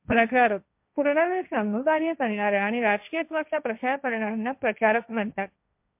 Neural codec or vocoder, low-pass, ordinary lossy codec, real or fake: codec, 16 kHz, 1.1 kbps, Voila-Tokenizer; 3.6 kHz; MP3, 32 kbps; fake